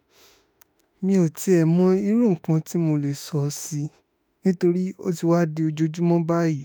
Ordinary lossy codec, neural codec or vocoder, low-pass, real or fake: none; autoencoder, 48 kHz, 32 numbers a frame, DAC-VAE, trained on Japanese speech; none; fake